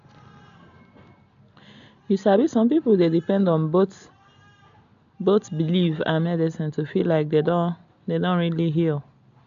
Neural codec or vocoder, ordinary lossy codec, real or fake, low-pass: none; AAC, 64 kbps; real; 7.2 kHz